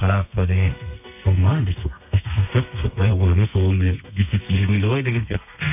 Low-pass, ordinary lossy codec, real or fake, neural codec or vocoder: 3.6 kHz; none; fake; codec, 32 kHz, 1.9 kbps, SNAC